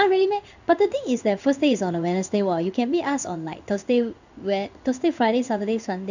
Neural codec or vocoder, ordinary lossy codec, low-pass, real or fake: codec, 16 kHz in and 24 kHz out, 1 kbps, XY-Tokenizer; none; 7.2 kHz; fake